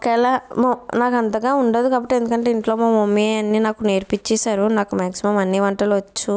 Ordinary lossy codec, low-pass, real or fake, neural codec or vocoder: none; none; real; none